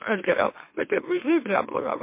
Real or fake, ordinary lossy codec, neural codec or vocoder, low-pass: fake; MP3, 24 kbps; autoencoder, 44.1 kHz, a latent of 192 numbers a frame, MeloTTS; 3.6 kHz